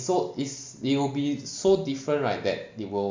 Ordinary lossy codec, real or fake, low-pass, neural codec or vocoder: MP3, 64 kbps; real; 7.2 kHz; none